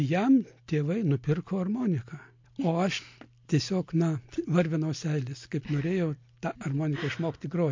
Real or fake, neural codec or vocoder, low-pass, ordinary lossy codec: real; none; 7.2 kHz; MP3, 48 kbps